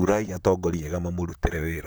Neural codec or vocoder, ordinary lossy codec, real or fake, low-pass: vocoder, 44.1 kHz, 128 mel bands, Pupu-Vocoder; none; fake; none